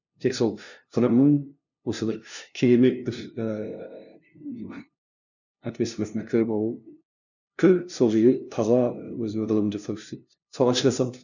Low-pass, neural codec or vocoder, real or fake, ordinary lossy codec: 7.2 kHz; codec, 16 kHz, 0.5 kbps, FunCodec, trained on LibriTTS, 25 frames a second; fake; none